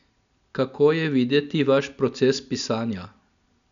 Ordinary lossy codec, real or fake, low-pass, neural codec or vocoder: MP3, 96 kbps; real; 7.2 kHz; none